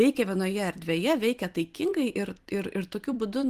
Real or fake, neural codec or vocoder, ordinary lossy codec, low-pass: fake; vocoder, 44.1 kHz, 128 mel bands every 256 samples, BigVGAN v2; Opus, 24 kbps; 14.4 kHz